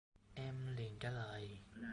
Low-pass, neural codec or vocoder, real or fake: 9.9 kHz; none; real